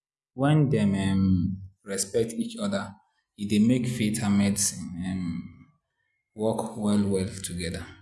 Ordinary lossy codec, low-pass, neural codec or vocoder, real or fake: none; none; none; real